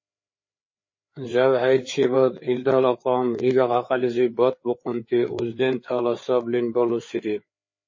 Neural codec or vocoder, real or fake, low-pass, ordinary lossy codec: codec, 16 kHz, 4 kbps, FreqCodec, larger model; fake; 7.2 kHz; MP3, 32 kbps